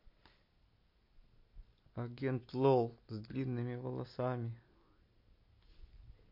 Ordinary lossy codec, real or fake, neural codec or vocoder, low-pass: MP3, 32 kbps; real; none; 5.4 kHz